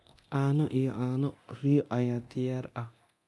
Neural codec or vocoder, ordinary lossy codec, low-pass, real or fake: codec, 24 kHz, 0.9 kbps, DualCodec; none; none; fake